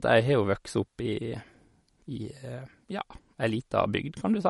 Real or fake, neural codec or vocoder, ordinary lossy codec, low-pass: real; none; MP3, 48 kbps; 19.8 kHz